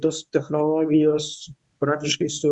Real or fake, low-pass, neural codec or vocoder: fake; 10.8 kHz; codec, 24 kHz, 0.9 kbps, WavTokenizer, medium speech release version 1